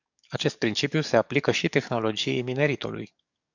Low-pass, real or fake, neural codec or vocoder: 7.2 kHz; fake; codec, 44.1 kHz, 7.8 kbps, DAC